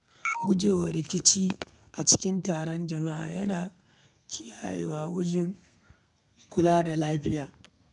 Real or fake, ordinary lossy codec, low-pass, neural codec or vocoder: fake; none; 10.8 kHz; codec, 32 kHz, 1.9 kbps, SNAC